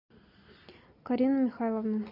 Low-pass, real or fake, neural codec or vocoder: 5.4 kHz; real; none